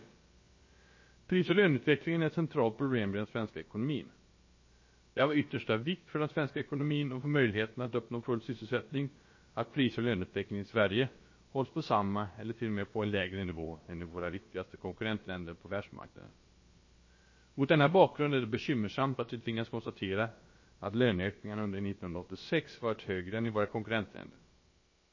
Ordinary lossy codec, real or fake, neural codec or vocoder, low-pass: MP3, 32 kbps; fake; codec, 16 kHz, about 1 kbps, DyCAST, with the encoder's durations; 7.2 kHz